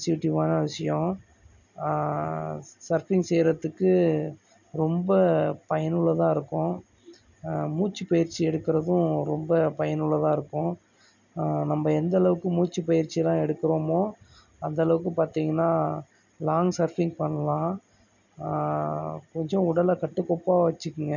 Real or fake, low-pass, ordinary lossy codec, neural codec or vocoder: real; 7.2 kHz; none; none